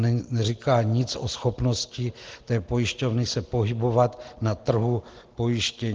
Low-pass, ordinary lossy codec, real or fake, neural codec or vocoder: 7.2 kHz; Opus, 16 kbps; real; none